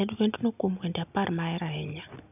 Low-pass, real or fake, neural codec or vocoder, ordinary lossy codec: 3.6 kHz; real; none; none